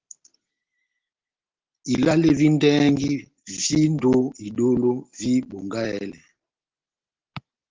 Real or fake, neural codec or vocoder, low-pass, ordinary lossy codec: real; none; 7.2 kHz; Opus, 16 kbps